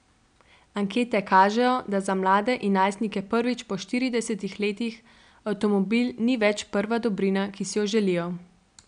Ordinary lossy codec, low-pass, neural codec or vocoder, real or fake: none; 9.9 kHz; none; real